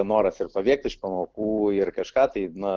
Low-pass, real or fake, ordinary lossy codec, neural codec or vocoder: 7.2 kHz; real; Opus, 16 kbps; none